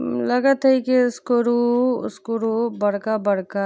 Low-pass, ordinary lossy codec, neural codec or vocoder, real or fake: none; none; none; real